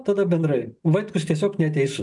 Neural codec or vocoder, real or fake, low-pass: none; real; 10.8 kHz